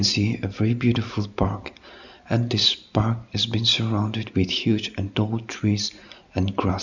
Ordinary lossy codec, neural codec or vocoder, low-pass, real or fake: none; none; 7.2 kHz; real